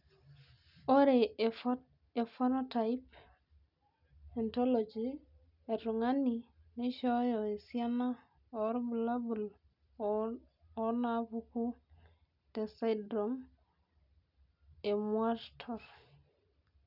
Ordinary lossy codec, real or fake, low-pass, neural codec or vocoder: none; real; 5.4 kHz; none